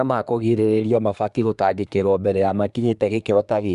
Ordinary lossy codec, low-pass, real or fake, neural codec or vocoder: none; 10.8 kHz; fake; codec, 24 kHz, 1 kbps, SNAC